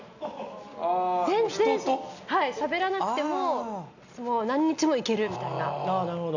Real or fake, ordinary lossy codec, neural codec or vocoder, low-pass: real; none; none; 7.2 kHz